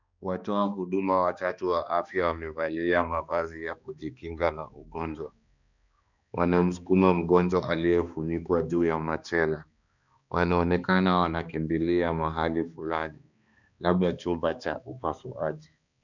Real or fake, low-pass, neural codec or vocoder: fake; 7.2 kHz; codec, 16 kHz, 2 kbps, X-Codec, HuBERT features, trained on balanced general audio